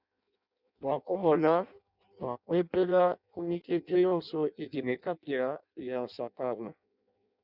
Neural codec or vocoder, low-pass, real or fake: codec, 16 kHz in and 24 kHz out, 0.6 kbps, FireRedTTS-2 codec; 5.4 kHz; fake